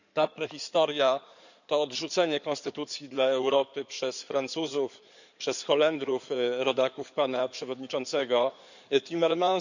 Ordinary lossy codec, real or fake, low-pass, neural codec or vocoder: none; fake; 7.2 kHz; codec, 16 kHz in and 24 kHz out, 2.2 kbps, FireRedTTS-2 codec